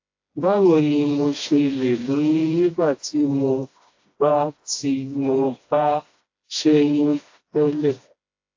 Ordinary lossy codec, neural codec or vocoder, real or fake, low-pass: AAC, 48 kbps; codec, 16 kHz, 1 kbps, FreqCodec, smaller model; fake; 7.2 kHz